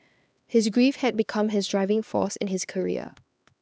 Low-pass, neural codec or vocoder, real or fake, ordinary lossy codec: none; codec, 16 kHz, 2 kbps, X-Codec, HuBERT features, trained on LibriSpeech; fake; none